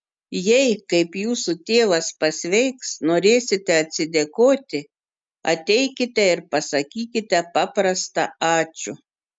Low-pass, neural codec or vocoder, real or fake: 9.9 kHz; none; real